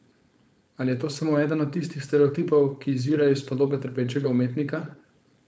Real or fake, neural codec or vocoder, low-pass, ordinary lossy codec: fake; codec, 16 kHz, 4.8 kbps, FACodec; none; none